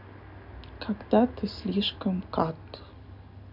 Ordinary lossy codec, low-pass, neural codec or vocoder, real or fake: none; 5.4 kHz; none; real